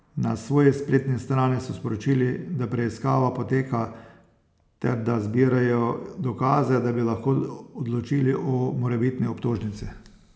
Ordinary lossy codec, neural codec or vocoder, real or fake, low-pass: none; none; real; none